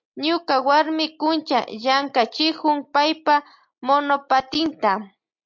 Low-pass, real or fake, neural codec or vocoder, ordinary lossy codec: 7.2 kHz; real; none; MP3, 48 kbps